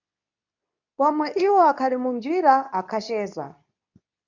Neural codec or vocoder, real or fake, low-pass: codec, 24 kHz, 0.9 kbps, WavTokenizer, medium speech release version 2; fake; 7.2 kHz